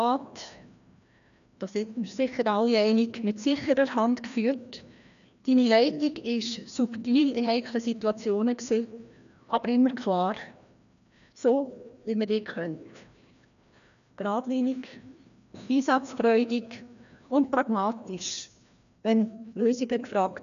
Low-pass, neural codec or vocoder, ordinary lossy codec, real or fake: 7.2 kHz; codec, 16 kHz, 1 kbps, FreqCodec, larger model; none; fake